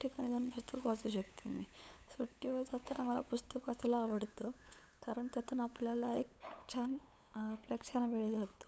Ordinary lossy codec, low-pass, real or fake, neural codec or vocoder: none; none; fake; codec, 16 kHz, 8 kbps, FunCodec, trained on LibriTTS, 25 frames a second